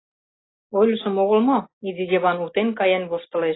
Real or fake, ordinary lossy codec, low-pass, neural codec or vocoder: real; AAC, 16 kbps; 7.2 kHz; none